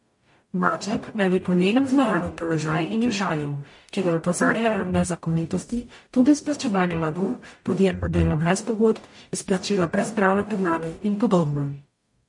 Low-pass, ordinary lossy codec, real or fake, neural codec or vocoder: 10.8 kHz; MP3, 48 kbps; fake; codec, 44.1 kHz, 0.9 kbps, DAC